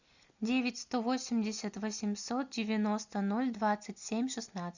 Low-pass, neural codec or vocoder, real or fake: 7.2 kHz; none; real